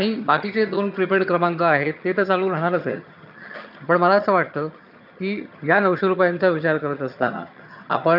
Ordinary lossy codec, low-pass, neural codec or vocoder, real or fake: none; 5.4 kHz; vocoder, 22.05 kHz, 80 mel bands, HiFi-GAN; fake